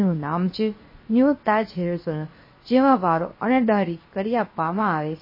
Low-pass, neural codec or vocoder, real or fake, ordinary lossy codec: 5.4 kHz; codec, 16 kHz, 0.7 kbps, FocalCodec; fake; MP3, 24 kbps